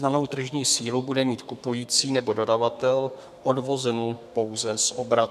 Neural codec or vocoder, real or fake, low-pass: codec, 32 kHz, 1.9 kbps, SNAC; fake; 14.4 kHz